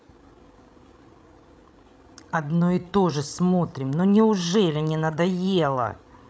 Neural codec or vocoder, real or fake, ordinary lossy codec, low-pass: codec, 16 kHz, 16 kbps, FreqCodec, larger model; fake; none; none